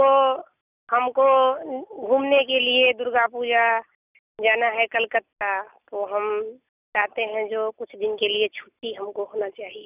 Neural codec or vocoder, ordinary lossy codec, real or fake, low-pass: none; none; real; 3.6 kHz